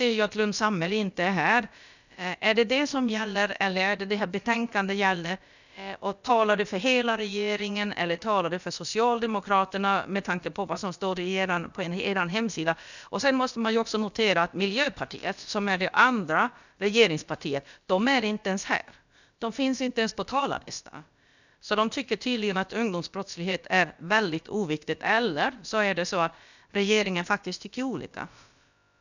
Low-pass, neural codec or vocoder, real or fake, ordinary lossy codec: 7.2 kHz; codec, 16 kHz, about 1 kbps, DyCAST, with the encoder's durations; fake; none